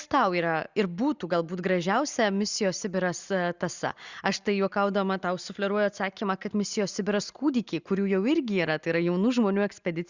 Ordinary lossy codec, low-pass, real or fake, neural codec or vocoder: Opus, 64 kbps; 7.2 kHz; real; none